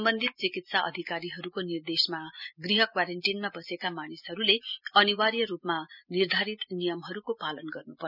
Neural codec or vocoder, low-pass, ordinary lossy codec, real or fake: none; 5.4 kHz; none; real